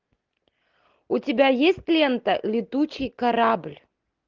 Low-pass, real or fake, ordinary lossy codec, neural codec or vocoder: 7.2 kHz; real; Opus, 32 kbps; none